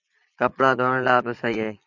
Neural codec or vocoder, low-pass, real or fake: vocoder, 22.05 kHz, 80 mel bands, Vocos; 7.2 kHz; fake